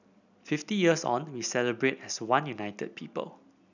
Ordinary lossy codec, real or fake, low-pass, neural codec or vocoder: none; real; 7.2 kHz; none